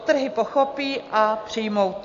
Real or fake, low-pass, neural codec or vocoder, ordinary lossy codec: real; 7.2 kHz; none; AAC, 48 kbps